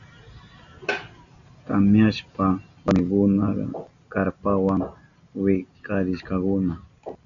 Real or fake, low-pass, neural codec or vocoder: real; 7.2 kHz; none